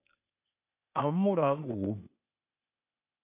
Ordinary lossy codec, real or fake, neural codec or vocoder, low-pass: AAC, 32 kbps; fake; codec, 16 kHz, 0.8 kbps, ZipCodec; 3.6 kHz